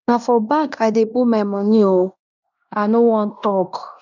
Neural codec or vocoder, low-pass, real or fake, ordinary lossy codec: codec, 16 kHz in and 24 kHz out, 0.9 kbps, LongCat-Audio-Codec, fine tuned four codebook decoder; 7.2 kHz; fake; none